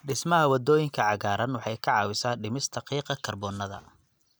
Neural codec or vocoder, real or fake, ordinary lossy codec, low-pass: none; real; none; none